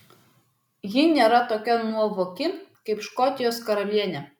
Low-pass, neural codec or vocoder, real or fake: 19.8 kHz; none; real